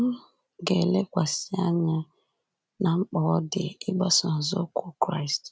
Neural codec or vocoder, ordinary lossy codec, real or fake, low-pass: none; none; real; none